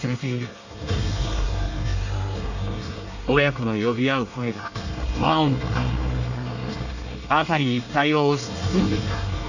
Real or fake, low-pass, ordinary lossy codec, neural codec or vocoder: fake; 7.2 kHz; none; codec, 24 kHz, 1 kbps, SNAC